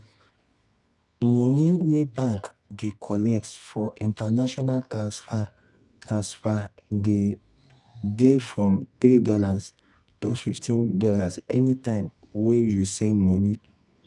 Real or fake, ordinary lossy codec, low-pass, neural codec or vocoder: fake; none; 10.8 kHz; codec, 24 kHz, 0.9 kbps, WavTokenizer, medium music audio release